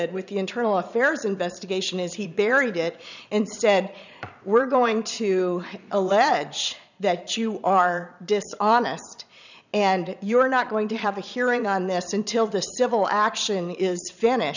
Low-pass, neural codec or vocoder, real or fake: 7.2 kHz; vocoder, 22.05 kHz, 80 mel bands, Vocos; fake